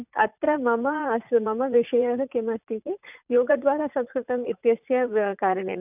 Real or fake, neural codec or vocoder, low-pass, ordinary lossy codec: fake; vocoder, 44.1 kHz, 80 mel bands, Vocos; 3.6 kHz; none